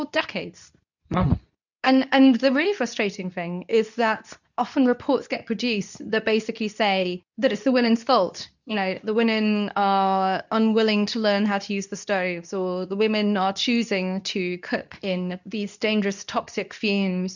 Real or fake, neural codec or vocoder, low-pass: fake; codec, 24 kHz, 0.9 kbps, WavTokenizer, medium speech release version 2; 7.2 kHz